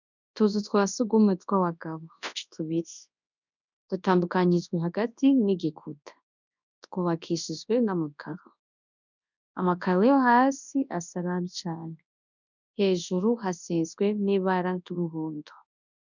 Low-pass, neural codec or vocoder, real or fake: 7.2 kHz; codec, 24 kHz, 0.9 kbps, WavTokenizer, large speech release; fake